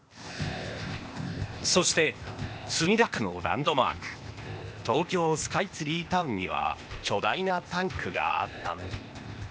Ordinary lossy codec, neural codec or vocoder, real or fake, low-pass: none; codec, 16 kHz, 0.8 kbps, ZipCodec; fake; none